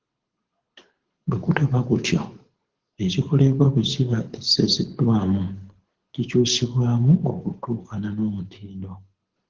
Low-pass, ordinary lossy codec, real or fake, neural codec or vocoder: 7.2 kHz; Opus, 16 kbps; fake; codec, 24 kHz, 6 kbps, HILCodec